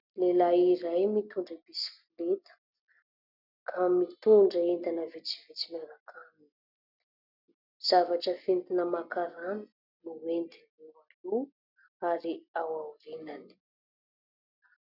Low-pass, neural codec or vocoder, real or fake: 5.4 kHz; none; real